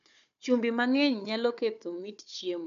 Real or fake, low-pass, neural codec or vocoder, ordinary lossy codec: fake; 7.2 kHz; codec, 16 kHz, 4 kbps, FunCodec, trained on Chinese and English, 50 frames a second; AAC, 96 kbps